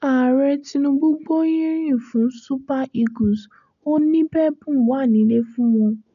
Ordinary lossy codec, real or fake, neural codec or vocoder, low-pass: none; real; none; 7.2 kHz